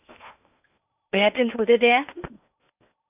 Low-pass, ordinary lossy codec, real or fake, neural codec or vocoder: 3.6 kHz; none; fake; codec, 16 kHz in and 24 kHz out, 0.8 kbps, FocalCodec, streaming, 65536 codes